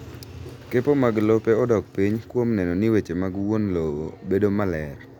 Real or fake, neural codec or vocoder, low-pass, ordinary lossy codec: real; none; 19.8 kHz; none